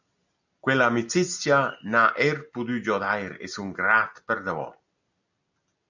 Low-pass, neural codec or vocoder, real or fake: 7.2 kHz; none; real